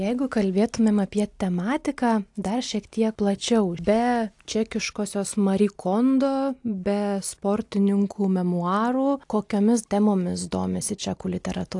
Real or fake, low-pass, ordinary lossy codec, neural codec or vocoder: real; 10.8 kHz; AAC, 64 kbps; none